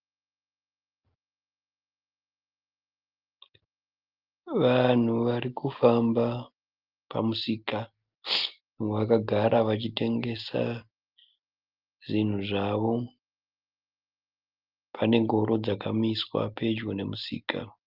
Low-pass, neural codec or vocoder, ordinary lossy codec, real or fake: 5.4 kHz; none; Opus, 32 kbps; real